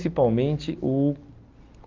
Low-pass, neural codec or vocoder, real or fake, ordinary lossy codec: 7.2 kHz; none; real; Opus, 24 kbps